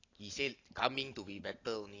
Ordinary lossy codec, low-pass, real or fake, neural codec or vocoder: AAC, 32 kbps; 7.2 kHz; real; none